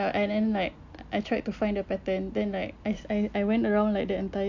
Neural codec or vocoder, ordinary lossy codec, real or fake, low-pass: none; AAC, 48 kbps; real; 7.2 kHz